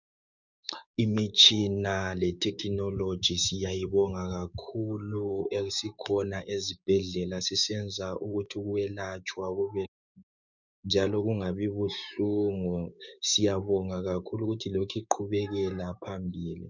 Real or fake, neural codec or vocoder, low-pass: fake; codec, 16 kHz, 6 kbps, DAC; 7.2 kHz